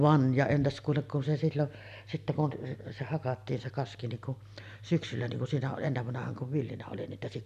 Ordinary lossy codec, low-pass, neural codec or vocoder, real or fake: none; 14.4 kHz; none; real